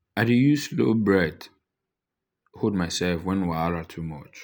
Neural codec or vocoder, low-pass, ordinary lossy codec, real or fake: none; none; none; real